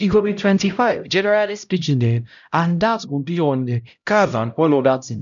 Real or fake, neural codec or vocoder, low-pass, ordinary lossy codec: fake; codec, 16 kHz, 0.5 kbps, X-Codec, HuBERT features, trained on LibriSpeech; 7.2 kHz; none